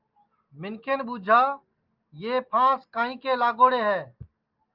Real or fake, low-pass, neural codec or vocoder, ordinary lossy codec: real; 5.4 kHz; none; Opus, 32 kbps